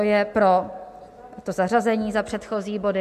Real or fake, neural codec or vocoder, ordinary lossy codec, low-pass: real; none; MP3, 64 kbps; 14.4 kHz